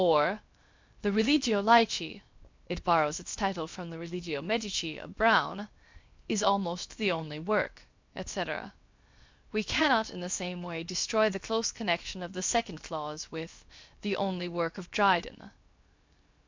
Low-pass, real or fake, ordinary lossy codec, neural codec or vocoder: 7.2 kHz; fake; MP3, 64 kbps; codec, 16 kHz, 0.7 kbps, FocalCodec